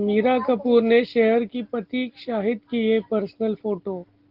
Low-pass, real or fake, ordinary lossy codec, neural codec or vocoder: 5.4 kHz; real; Opus, 16 kbps; none